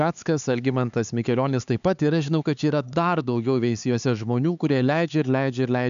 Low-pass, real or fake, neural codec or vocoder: 7.2 kHz; fake; codec, 16 kHz, 4 kbps, X-Codec, HuBERT features, trained on LibriSpeech